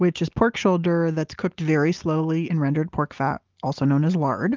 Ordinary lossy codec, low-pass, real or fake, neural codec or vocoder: Opus, 24 kbps; 7.2 kHz; real; none